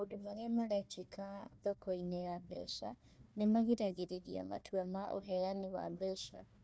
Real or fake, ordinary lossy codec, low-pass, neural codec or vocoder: fake; none; none; codec, 16 kHz, 1 kbps, FunCodec, trained on Chinese and English, 50 frames a second